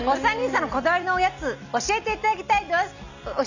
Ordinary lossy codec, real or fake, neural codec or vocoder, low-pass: none; real; none; 7.2 kHz